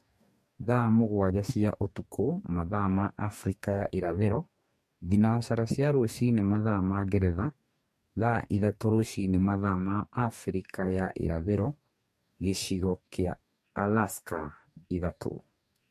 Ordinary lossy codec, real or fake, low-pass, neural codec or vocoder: MP3, 64 kbps; fake; 14.4 kHz; codec, 44.1 kHz, 2.6 kbps, DAC